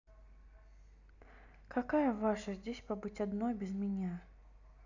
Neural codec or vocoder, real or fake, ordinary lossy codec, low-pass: none; real; none; 7.2 kHz